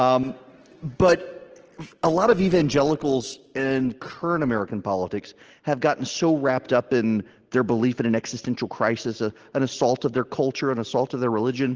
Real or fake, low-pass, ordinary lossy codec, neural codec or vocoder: real; 7.2 kHz; Opus, 16 kbps; none